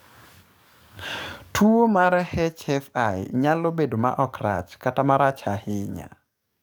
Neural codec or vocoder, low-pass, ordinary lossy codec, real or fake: codec, 44.1 kHz, 7.8 kbps, DAC; none; none; fake